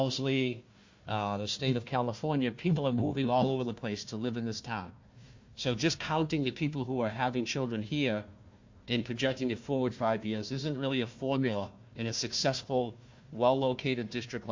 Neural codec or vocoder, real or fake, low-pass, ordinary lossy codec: codec, 16 kHz, 1 kbps, FunCodec, trained on Chinese and English, 50 frames a second; fake; 7.2 kHz; MP3, 48 kbps